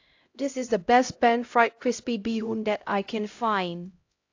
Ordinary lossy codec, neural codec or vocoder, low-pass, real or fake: AAC, 48 kbps; codec, 16 kHz, 0.5 kbps, X-Codec, HuBERT features, trained on LibriSpeech; 7.2 kHz; fake